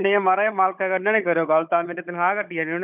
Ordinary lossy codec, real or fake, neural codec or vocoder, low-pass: none; fake; codec, 16 kHz, 16 kbps, FunCodec, trained on Chinese and English, 50 frames a second; 3.6 kHz